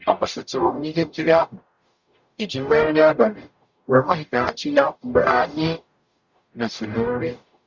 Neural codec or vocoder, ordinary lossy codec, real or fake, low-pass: codec, 44.1 kHz, 0.9 kbps, DAC; Opus, 64 kbps; fake; 7.2 kHz